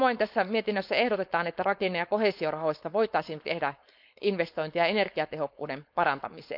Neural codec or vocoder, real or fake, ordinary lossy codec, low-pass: codec, 16 kHz, 4.8 kbps, FACodec; fake; none; 5.4 kHz